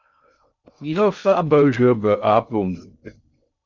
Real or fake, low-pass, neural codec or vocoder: fake; 7.2 kHz; codec, 16 kHz in and 24 kHz out, 0.6 kbps, FocalCodec, streaming, 2048 codes